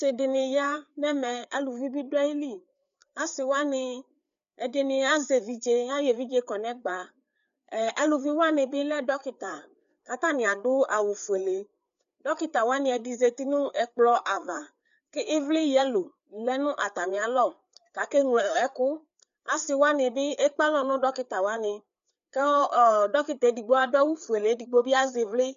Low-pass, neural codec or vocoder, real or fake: 7.2 kHz; codec, 16 kHz, 4 kbps, FreqCodec, larger model; fake